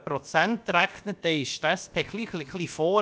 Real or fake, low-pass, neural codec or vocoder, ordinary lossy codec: fake; none; codec, 16 kHz, about 1 kbps, DyCAST, with the encoder's durations; none